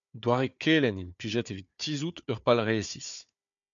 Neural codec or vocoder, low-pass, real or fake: codec, 16 kHz, 4 kbps, FunCodec, trained on Chinese and English, 50 frames a second; 7.2 kHz; fake